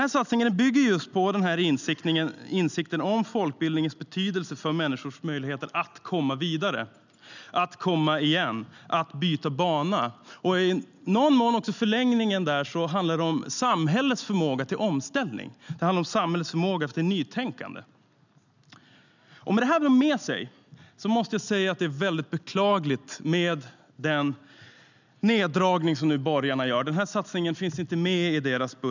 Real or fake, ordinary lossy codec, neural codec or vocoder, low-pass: real; none; none; 7.2 kHz